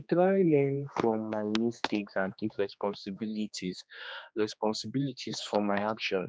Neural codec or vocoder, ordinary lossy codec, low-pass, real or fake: codec, 16 kHz, 2 kbps, X-Codec, HuBERT features, trained on general audio; none; none; fake